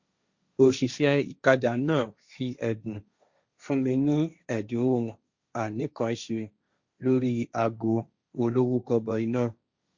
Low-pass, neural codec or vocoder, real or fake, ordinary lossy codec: 7.2 kHz; codec, 16 kHz, 1.1 kbps, Voila-Tokenizer; fake; Opus, 64 kbps